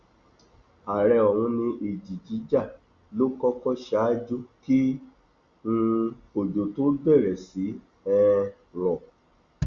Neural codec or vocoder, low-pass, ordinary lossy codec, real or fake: none; 7.2 kHz; Opus, 64 kbps; real